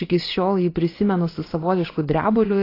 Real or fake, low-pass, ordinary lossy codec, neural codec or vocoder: fake; 5.4 kHz; AAC, 24 kbps; vocoder, 24 kHz, 100 mel bands, Vocos